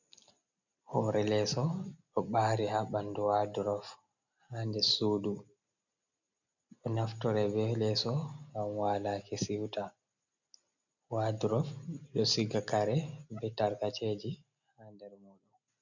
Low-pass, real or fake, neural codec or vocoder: 7.2 kHz; real; none